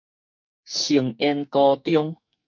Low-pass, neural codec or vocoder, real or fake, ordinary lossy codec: 7.2 kHz; codec, 44.1 kHz, 2.6 kbps, SNAC; fake; MP3, 48 kbps